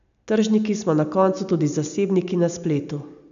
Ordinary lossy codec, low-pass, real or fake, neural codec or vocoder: none; 7.2 kHz; real; none